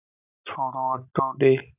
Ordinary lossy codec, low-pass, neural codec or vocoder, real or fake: AAC, 32 kbps; 3.6 kHz; none; real